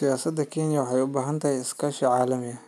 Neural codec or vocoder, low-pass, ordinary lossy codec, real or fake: none; 14.4 kHz; none; real